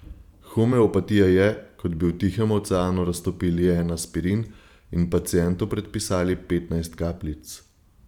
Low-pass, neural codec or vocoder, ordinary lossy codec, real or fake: 19.8 kHz; vocoder, 44.1 kHz, 128 mel bands every 512 samples, BigVGAN v2; none; fake